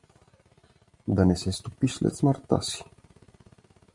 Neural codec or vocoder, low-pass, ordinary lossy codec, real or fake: vocoder, 44.1 kHz, 128 mel bands every 512 samples, BigVGAN v2; 10.8 kHz; MP3, 96 kbps; fake